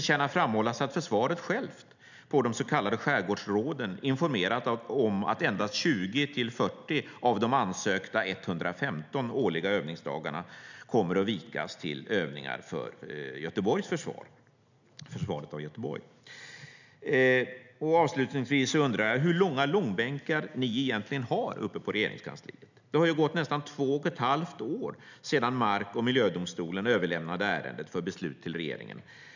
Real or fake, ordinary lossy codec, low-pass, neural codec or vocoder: real; none; 7.2 kHz; none